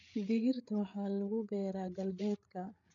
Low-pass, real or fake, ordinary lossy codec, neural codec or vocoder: 7.2 kHz; fake; none; codec, 16 kHz, 8 kbps, FreqCodec, larger model